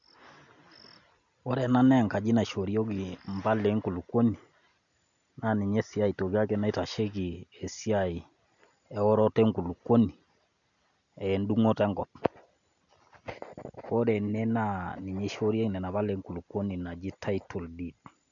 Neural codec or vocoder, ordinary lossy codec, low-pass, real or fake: none; none; 7.2 kHz; real